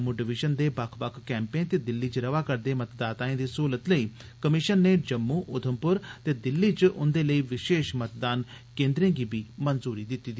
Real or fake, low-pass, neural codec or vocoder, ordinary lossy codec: real; none; none; none